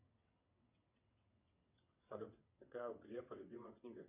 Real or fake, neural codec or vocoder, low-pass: fake; vocoder, 22.05 kHz, 80 mel bands, WaveNeXt; 3.6 kHz